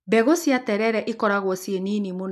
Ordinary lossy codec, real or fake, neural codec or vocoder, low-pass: none; real; none; 14.4 kHz